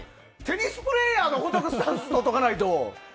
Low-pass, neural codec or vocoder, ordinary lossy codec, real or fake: none; none; none; real